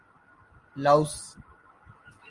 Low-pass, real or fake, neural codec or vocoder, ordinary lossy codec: 10.8 kHz; real; none; Opus, 24 kbps